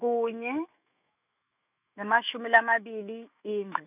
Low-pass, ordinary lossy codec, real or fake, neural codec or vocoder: 3.6 kHz; none; fake; autoencoder, 48 kHz, 128 numbers a frame, DAC-VAE, trained on Japanese speech